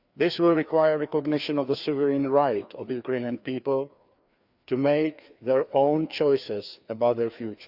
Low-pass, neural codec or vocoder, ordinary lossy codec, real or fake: 5.4 kHz; codec, 16 kHz, 2 kbps, FreqCodec, larger model; Opus, 64 kbps; fake